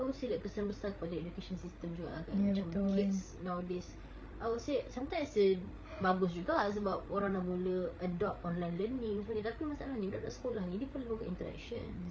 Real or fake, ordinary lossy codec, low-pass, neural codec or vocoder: fake; none; none; codec, 16 kHz, 8 kbps, FreqCodec, larger model